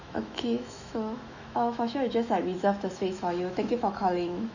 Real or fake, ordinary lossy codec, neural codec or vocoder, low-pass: fake; none; autoencoder, 48 kHz, 128 numbers a frame, DAC-VAE, trained on Japanese speech; 7.2 kHz